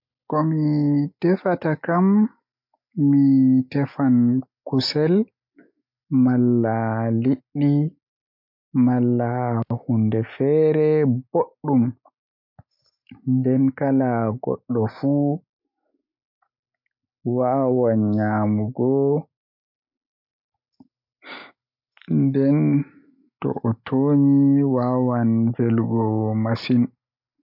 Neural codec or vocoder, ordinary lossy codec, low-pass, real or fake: none; MP3, 48 kbps; 5.4 kHz; real